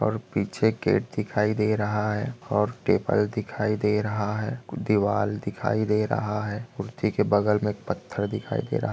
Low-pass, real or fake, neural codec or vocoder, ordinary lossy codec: none; real; none; none